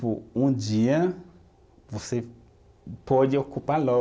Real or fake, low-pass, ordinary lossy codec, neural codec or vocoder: real; none; none; none